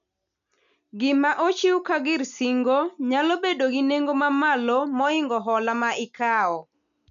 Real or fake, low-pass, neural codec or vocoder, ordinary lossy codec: real; 7.2 kHz; none; none